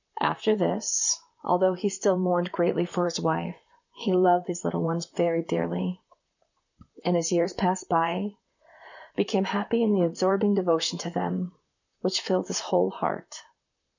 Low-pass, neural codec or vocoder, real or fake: 7.2 kHz; vocoder, 44.1 kHz, 128 mel bands, Pupu-Vocoder; fake